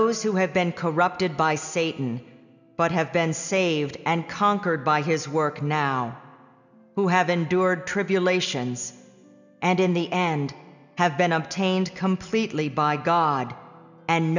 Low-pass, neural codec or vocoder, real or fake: 7.2 kHz; none; real